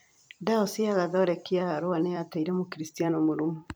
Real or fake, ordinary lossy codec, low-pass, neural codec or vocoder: fake; none; none; vocoder, 44.1 kHz, 128 mel bands every 256 samples, BigVGAN v2